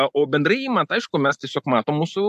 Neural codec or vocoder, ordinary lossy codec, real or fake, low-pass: none; AAC, 96 kbps; real; 14.4 kHz